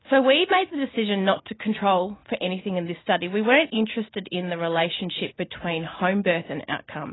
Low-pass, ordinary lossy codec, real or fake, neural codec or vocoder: 7.2 kHz; AAC, 16 kbps; real; none